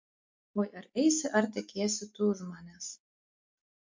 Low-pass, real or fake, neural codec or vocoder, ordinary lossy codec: 7.2 kHz; real; none; MP3, 48 kbps